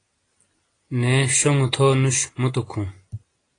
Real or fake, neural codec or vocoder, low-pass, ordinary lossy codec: real; none; 9.9 kHz; AAC, 32 kbps